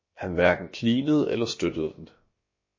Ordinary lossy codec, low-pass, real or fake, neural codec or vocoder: MP3, 32 kbps; 7.2 kHz; fake; codec, 16 kHz, about 1 kbps, DyCAST, with the encoder's durations